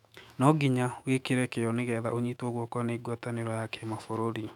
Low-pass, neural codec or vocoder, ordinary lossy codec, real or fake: 19.8 kHz; autoencoder, 48 kHz, 128 numbers a frame, DAC-VAE, trained on Japanese speech; none; fake